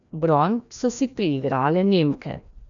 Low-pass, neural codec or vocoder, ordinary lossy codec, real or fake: 7.2 kHz; codec, 16 kHz, 1 kbps, FreqCodec, larger model; none; fake